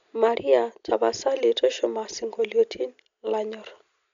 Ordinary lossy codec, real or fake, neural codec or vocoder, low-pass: MP3, 64 kbps; real; none; 7.2 kHz